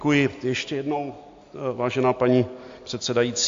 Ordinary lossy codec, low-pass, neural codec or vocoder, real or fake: AAC, 48 kbps; 7.2 kHz; none; real